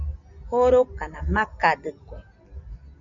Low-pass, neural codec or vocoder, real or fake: 7.2 kHz; none; real